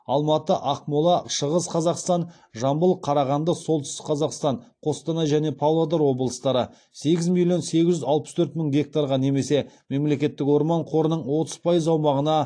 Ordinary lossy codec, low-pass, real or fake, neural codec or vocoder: AAC, 48 kbps; 9.9 kHz; real; none